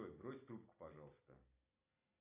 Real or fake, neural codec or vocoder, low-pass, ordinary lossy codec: real; none; 3.6 kHz; AAC, 32 kbps